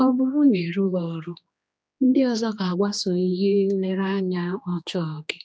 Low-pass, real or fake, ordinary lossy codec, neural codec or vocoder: none; fake; none; codec, 16 kHz, 2 kbps, X-Codec, HuBERT features, trained on general audio